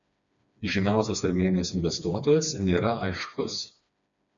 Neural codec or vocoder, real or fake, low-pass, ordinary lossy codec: codec, 16 kHz, 2 kbps, FreqCodec, smaller model; fake; 7.2 kHz; MP3, 64 kbps